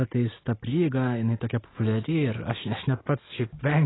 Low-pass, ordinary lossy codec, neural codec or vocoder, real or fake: 7.2 kHz; AAC, 16 kbps; none; real